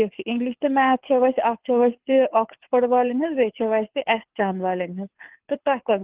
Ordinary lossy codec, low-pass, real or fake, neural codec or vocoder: Opus, 16 kbps; 3.6 kHz; fake; codec, 24 kHz, 6 kbps, HILCodec